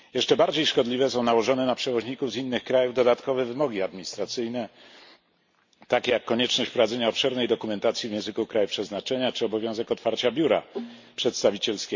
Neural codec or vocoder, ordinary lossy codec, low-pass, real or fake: none; MP3, 48 kbps; 7.2 kHz; real